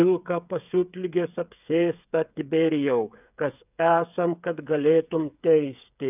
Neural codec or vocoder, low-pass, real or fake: codec, 16 kHz, 8 kbps, FreqCodec, smaller model; 3.6 kHz; fake